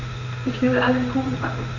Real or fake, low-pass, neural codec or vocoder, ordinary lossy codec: fake; 7.2 kHz; codec, 16 kHz in and 24 kHz out, 1 kbps, XY-Tokenizer; none